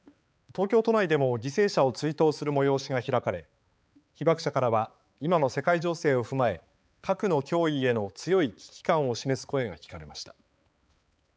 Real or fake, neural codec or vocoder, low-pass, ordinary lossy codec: fake; codec, 16 kHz, 4 kbps, X-Codec, HuBERT features, trained on balanced general audio; none; none